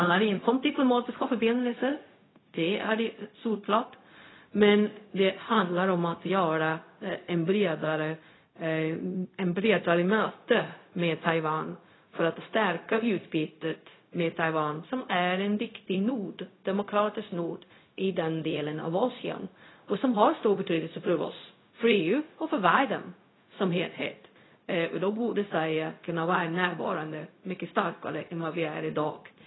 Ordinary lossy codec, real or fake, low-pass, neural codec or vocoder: AAC, 16 kbps; fake; 7.2 kHz; codec, 16 kHz, 0.4 kbps, LongCat-Audio-Codec